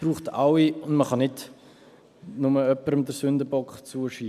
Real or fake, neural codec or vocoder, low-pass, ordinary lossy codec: real; none; 14.4 kHz; none